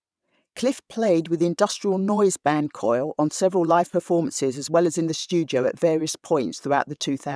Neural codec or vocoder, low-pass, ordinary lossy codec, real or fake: vocoder, 22.05 kHz, 80 mel bands, WaveNeXt; none; none; fake